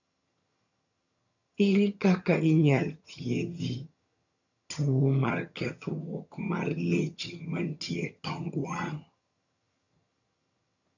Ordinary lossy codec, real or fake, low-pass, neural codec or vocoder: none; fake; 7.2 kHz; vocoder, 22.05 kHz, 80 mel bands, HiFi-GAN